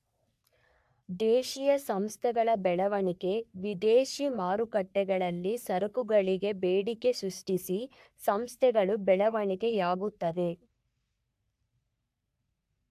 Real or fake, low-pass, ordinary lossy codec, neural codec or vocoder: fake; 14.4 kHz; AAC, 96 kbps; codec, 44.1 kHz, 3.4 kbps, Pupu-Codec